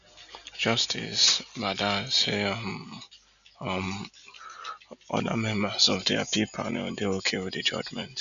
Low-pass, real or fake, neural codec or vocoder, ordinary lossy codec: 7.2 kHz; real; none; none